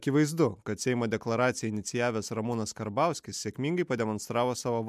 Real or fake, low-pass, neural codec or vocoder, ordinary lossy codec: real; 14.4 kHz; none; MP3, 96 kbps